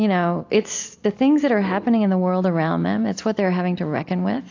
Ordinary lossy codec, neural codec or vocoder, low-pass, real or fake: AAC, 48 kbps; none; 7.2 kHz; real